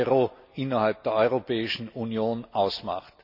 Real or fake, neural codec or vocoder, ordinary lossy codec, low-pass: real; none; none; 5.4 kHz